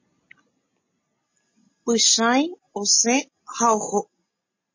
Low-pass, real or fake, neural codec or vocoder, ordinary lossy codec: 7.2 kHz; real; none; MP3, 32 kbps